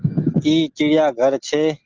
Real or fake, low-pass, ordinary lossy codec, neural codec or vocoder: real; 7.2 kHz; Opus, 16 kbps; none